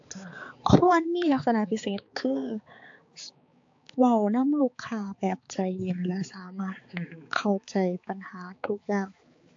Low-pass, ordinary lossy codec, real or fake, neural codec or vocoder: 7.2 kHz; AAC, 48 kbps; fake; codec, 16 kHz, 4 kbps, X-Codec, HuBERT features, trained on balanced general audio